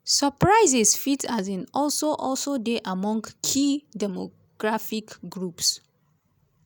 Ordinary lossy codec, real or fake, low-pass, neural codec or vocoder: none; real; none; none